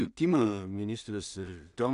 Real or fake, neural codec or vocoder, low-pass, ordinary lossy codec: fake; codec, 16 kHz in and 24 kHz out, 0.4 kbps, LongCat-Audio-Codec, two codebook decoder; 10.8 kHz; AAC, 96 kbps